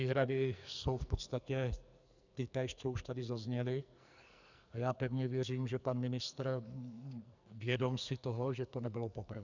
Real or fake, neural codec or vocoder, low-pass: fake; codec, 44.1 kHz, 2.6 kbps, SNAC; 7.2 kHz